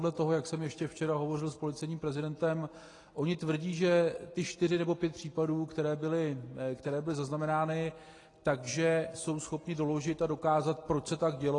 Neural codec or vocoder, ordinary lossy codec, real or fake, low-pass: none; AAC, 32 kbps; real; 10.8 kHz